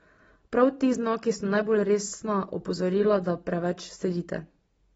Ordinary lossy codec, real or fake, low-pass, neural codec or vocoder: AAC, 24 kbps; real; 19.8 kHz; none